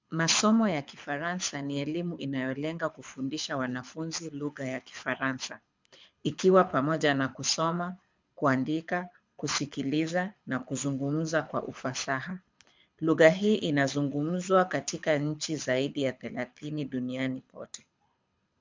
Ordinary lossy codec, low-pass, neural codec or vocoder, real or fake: MP3, 64 kbps; 7.2 kHz; codec, 24 kHz, 6 kbps, HILCodec; fake